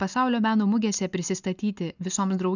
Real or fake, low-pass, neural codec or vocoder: real; 7.2 kHz; none